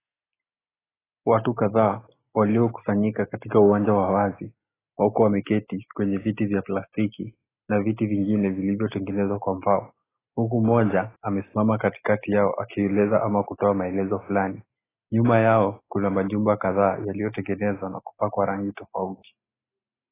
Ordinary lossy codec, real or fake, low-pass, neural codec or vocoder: AAC, 16 kbps; real; 3.6 kHz; none